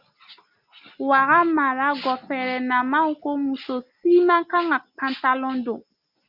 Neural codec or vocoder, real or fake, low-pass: none; real; 5.4 kHz